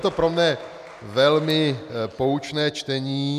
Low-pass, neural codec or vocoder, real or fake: 14.4 kHz; none; real